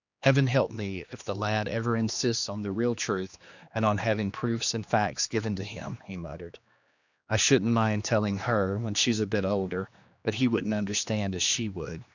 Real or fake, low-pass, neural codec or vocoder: fake; 7.2 kHz; codec, 16 kHz, 2 kbps, X-Codec, HuBERT features, trained on general audio